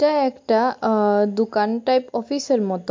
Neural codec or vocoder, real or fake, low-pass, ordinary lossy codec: none; real; 7.2 kHz; MP3, 48 kbps